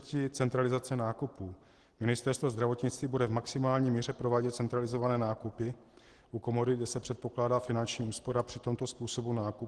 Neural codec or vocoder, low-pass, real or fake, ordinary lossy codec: none; 10.8 kHz; real; Opus, 16 kbps